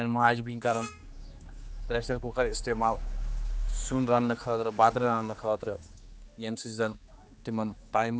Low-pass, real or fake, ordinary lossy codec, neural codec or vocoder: none; fake; none; codec, 16 kHz, 2 kbps, X-Codec, HuBERT features, trained on general audio